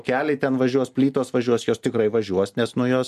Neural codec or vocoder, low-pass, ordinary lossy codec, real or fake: none; 14.4 kHz; MP3, 64 kbps; real